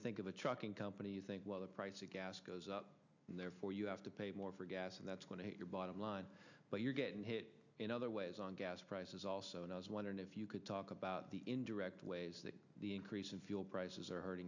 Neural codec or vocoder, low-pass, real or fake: none; 7.2 kHz; real